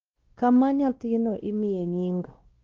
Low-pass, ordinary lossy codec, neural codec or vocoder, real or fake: 7.2 kHz; Opus, 16 kbps; codec, 16 kHz, 1 kbps, X-Codec, WavLM features, trained on Multilingual LibriSpeech; fake